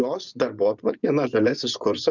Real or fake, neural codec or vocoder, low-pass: fake; vocoder, 24 kHz, 100 mel bands, Vocos; 7.2 kHz